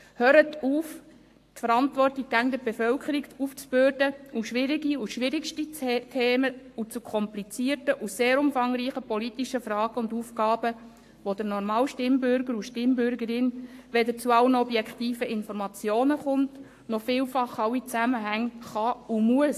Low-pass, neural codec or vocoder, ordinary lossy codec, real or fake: 14.4 kHz; codec, 44.1 kHz, 7.8 kbps, Pupu-Codec; AAC, 64 kbps; fake